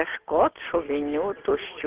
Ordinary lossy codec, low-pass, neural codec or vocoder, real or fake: Opus, 16 kbps; 3.6 kHz; none; real